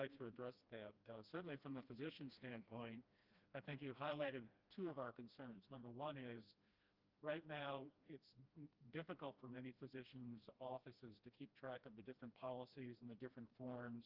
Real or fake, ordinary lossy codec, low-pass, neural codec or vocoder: fake; Opus, 24 kbps; 5.4 kHz; codec, 16 kHz, 1 kbps, FreqCodec, smaller model